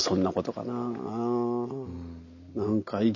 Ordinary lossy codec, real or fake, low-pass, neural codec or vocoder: none; real; 7.2 kHz; none